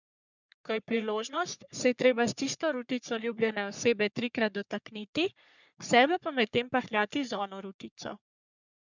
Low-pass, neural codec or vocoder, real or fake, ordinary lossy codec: 7.2 kHz; codec, 44.1 kHz, 3.4 kbps, Pupu-Codec; fake; none